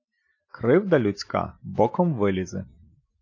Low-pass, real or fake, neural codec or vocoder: 7.2 kHz; real; none